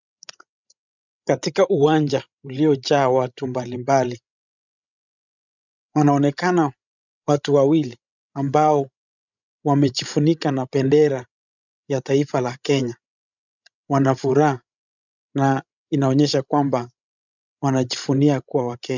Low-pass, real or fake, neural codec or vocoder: 7.2 kHz; fake; codec, 16 kHz, 16 kbps, FreqCodec, larger model